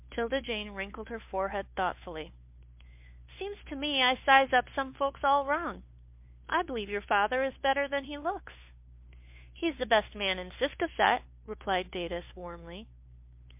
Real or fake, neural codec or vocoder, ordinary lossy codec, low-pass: fake; codec, 16 kHz, 2 kbps, FunCodec, trained on Chinese and English, 25 frames a second; MP3, 32 kbps; 3.6 kHz